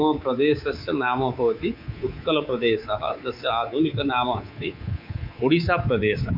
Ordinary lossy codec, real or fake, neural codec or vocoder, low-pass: MP3, 48 kbps; fake; codec, 24 kHz, 3.1 kbps, DualCodec; 5.4 kHz